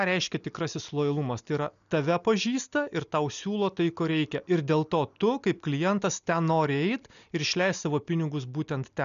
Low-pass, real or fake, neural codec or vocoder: 7.2 kHz; real; none